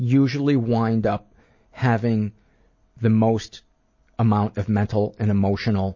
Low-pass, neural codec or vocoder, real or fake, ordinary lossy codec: 7.2 kHz; none; real; MP3, 32 kbps